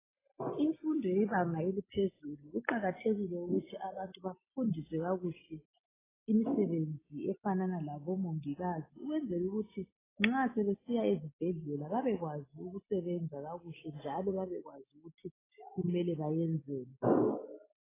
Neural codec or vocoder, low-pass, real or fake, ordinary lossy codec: none; 3.6 kHz; real; AAC, 16 kbps